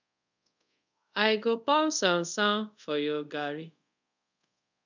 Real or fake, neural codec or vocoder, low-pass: fake; codec, 24 kHz, 0.5 kbps, DualCodec; 7.2 kHz